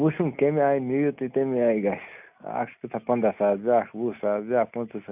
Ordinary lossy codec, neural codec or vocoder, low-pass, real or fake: none; none; 3.6 kHz; real